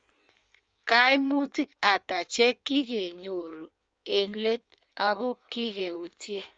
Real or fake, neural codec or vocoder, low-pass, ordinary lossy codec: fake; codec, 16 kHz in and 24 kHz out, 1.1 kbps, FireRedTTS-2 codec; 9.9 kHz; none